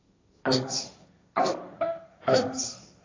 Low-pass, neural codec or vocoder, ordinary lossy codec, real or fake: 7.2 kHz; codec, 16 kHz, 1.1 kbps, Voila-Tokenizer; AAC, 32 kbps; fake